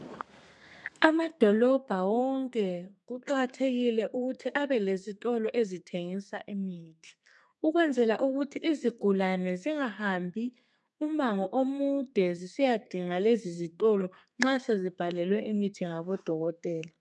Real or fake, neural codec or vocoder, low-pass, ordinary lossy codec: fake; codec, 32 kHz, 1.9 kbps, SNAC; 10.8 kHz; MP3, 96 kbps